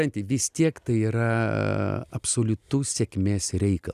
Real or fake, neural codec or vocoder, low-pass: real; none; 14.4 kHz